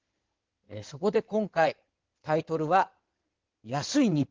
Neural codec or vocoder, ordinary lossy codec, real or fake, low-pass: codec, 16 kHz in and 24 kHz out, 2.2 kbps, FireRedTTS-2 codec; Opus, 16 kbps; fake; 7.2 kHz